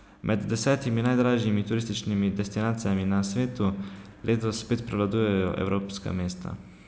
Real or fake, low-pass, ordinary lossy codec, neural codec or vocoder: real; none; none; none